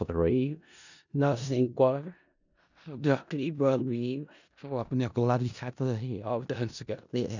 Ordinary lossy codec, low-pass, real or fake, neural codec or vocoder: none; 7.2 kHz; fake; codec, 16 kHz in and 24 kHz out, 0.4 kbps, LongCat-Audio-Codec, four codebook decoder